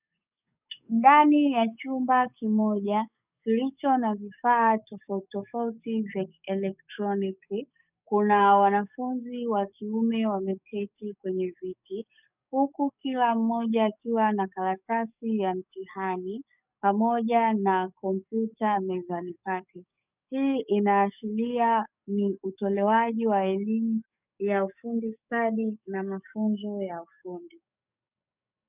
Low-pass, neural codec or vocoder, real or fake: 3.6 kHz; codec, 44.1 kHz, 7.8 kbps, DAC; fake